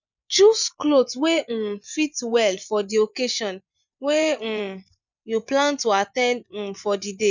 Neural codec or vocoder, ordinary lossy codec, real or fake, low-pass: vocoder, 24 kHz, 100 mel bands, Vocos; none; fake; 7.2 kHz